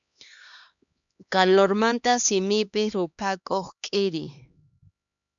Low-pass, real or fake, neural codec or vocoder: 7.2 kHz; fake; codec, 16 kHz, 2 kbps, X-Codec, HuBERT features, trained on LibriSpeech